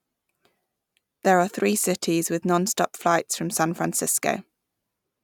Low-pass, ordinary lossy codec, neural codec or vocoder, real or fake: 19.8 kHz; none; none; real